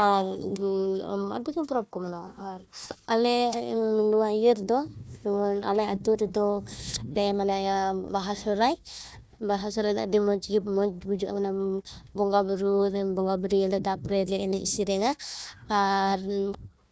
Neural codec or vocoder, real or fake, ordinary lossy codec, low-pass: codec, 16 kHz, 1 kbps, FunCodec, trained on Chinese and English, 50 frames a second; fake; none; none